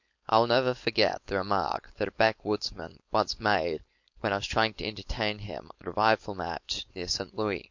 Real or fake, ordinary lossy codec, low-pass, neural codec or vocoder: fake; MP3, 48 kbps; 7.2 kHz; codec, 16 kHz, 4.8 kbps, FACodec